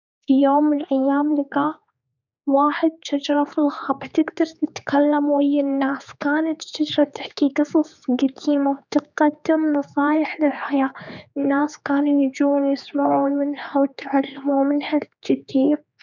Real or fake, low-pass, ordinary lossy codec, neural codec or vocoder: fake; 7.2 kHz; none; codec, 16 kHz, 4 kbps, X-Codec, HuBERT features, trained on general audio